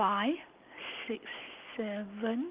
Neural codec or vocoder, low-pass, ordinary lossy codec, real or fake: none; 3.6 kHz; Opus, 24 kbps; real